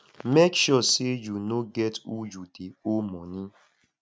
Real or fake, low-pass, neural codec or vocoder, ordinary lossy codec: real; none; none; none